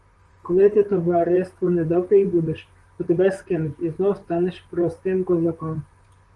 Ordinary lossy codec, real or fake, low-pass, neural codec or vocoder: Opus, 24 kbps; fake; 10.8 kHz; vocoder, 44.1 kHz, 128 mel bands, Pupu-Vocoder